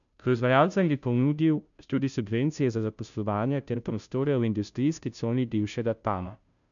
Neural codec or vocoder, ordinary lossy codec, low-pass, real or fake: codec, 16 kHz, 0.5 kbps, FunCodec, trained on Chinese and English, 25 frames a second; none; 7.2 kHz; fake